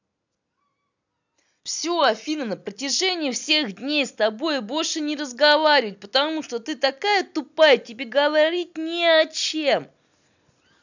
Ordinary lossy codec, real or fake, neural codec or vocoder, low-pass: none; real; none; 7.2 kHz